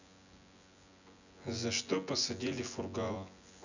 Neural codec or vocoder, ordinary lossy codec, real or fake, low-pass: vocoder, 24 kHz, 100 mel bands, Vocos; none; fake; 7.2 kHz